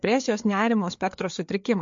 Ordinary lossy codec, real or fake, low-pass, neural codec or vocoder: MP3, 48 kbps; fake; 7.2 kHz; codec, 16 kHz, 4 kbps, FreqCodec, larger model